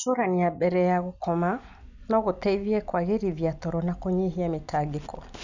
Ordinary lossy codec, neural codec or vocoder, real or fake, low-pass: none; none; real; 7.2 kHz